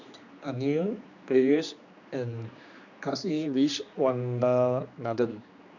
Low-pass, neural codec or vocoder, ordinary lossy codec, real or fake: 7.2 kHz; codec, 16 kHz, 2 kbps, X-Codec, HuBERT features, trained on general audio; none; fake